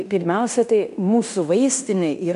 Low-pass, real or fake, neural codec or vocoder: 10.8 kHz; fake; codec, 16 kHz in and 24 kHz out, 0.9 kbps, LongCat-Audio-Codec, fine tuned four codebook decoder